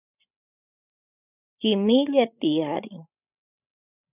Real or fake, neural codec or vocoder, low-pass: fake; codec, 16 kHz, 16 kbps, FreqCodec, larger model; 3.6 kHz